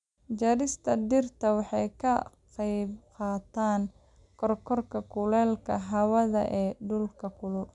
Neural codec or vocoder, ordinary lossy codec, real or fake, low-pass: none; none; real; 10.8 kHz